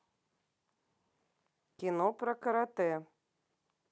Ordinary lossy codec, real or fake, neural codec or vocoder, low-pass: none; real; none; none